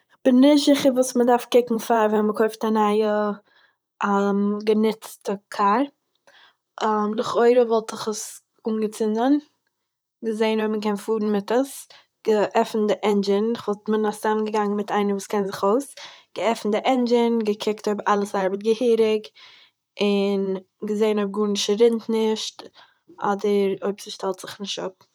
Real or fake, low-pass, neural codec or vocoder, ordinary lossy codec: fake; none; vocoder, 44.1 kHz, 128 mel bands, Pupu-Vocoder; none